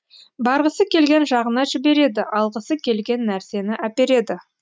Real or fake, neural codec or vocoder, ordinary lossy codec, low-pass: real; none; none; none